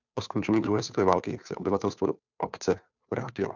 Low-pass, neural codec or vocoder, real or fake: 7.2 kHz; codec, 16 kHz, 2 kbps, FunCodec, trained on Chinese and English, 25 frames a second; fake